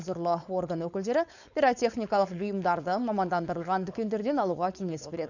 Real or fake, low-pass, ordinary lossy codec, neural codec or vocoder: fake; 7.2 kHz; none; codec, 16 kHz, 4.8 kbps, FACodec